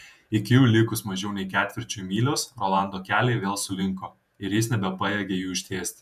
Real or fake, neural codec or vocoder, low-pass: fake; vocoder, 48 kHz, 128 mel bands, Vocos; 14.4 kHz